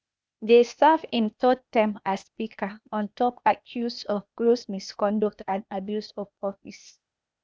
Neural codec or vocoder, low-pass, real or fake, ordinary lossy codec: codec, 16 kHz, 0.8 kbps, ZipCodec; none; fake; none